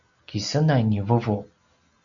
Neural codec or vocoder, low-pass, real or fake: none; 7.2 kHz; real